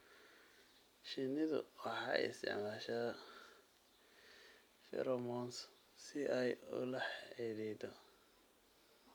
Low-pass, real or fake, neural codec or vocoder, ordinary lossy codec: none; real; none; none